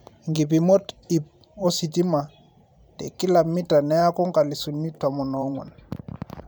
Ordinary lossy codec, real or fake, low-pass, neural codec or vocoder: none; fake; none; vocoder, 44.1 kHz, 128 mel bands every 512 samples, BigVGAN v2